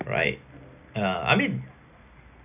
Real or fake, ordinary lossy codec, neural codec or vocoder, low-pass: real; none; none; 3.6 kHz